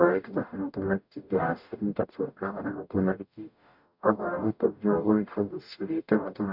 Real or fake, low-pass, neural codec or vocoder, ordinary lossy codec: fake; 5.4 kHz; codec, 44.1 kHz, 0.9 kbps, DAC; none